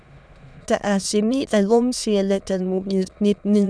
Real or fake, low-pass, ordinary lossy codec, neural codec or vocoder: fake; none; none; autoencoder, 22.05 kHz, a latent of 192 numbers a frame, VITS, trained on many speakers